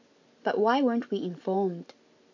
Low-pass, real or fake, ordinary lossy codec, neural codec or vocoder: 7.2 kHz; real; AAC, 48 kbps; none